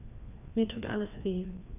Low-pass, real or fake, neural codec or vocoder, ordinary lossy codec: 3.6 kHz; fake; codec, 16 kHz, 2 kbps, FreqCodec, larger model; none